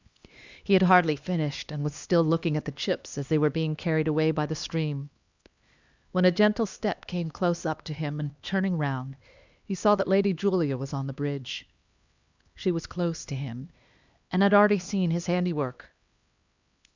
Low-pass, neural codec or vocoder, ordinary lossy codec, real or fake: 7.2 kHz; codec, 16 kHz, 2 kbps, X-Codec, HuBERT features, trained on LibriSpeech; Opus, 64 kbps; fake